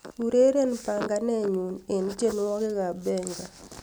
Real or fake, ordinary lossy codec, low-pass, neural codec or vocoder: real; none; none; none